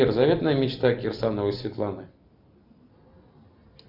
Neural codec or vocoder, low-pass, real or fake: none; 5.4 kHz; real